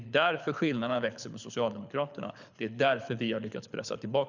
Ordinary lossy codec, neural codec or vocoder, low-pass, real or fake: none; codec, 24 kHz, 6 kbps, HILCodec; 7.2 kHz; fake